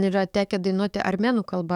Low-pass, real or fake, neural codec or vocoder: 19.8 kHz; fake; codec, 44.1 kHz, 7.8 kbps, DAC